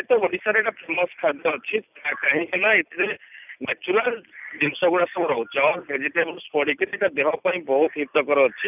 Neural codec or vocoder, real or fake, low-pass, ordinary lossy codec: none; real; 3.6 kHz; none